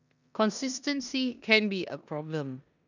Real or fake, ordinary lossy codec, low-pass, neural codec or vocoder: fake; none; 7.2 kHz; codec, 16 kHz in and 24 kHz out, 0.9 kbps, LongCat-Audio-Codec, four codebook decoder